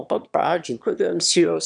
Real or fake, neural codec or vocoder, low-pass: fake; autoencoder, 22.05 kHz, a latent of 192 numbers a frame, VITS, trained on one speaker; 9.9 kHz